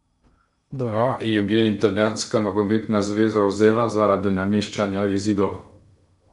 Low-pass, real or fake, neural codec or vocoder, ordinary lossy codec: 10.8 kHz; fake; codec, 16 kHz in and 24 kHz out, 0.6 kbps, FocalCodec, streaming, 2048 codes; none